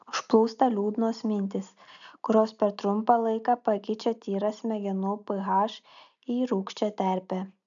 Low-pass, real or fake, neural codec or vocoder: 7.2 kHz; real; none